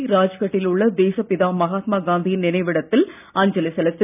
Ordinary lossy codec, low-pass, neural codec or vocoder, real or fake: none; 3.6 kHz; none; real